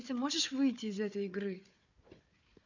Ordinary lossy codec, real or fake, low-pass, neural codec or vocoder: none; fake; 7.2 kHz; codec, 16 kHz, 4 kbps, FunCodec, trained on Chinese and English, 50 frames a second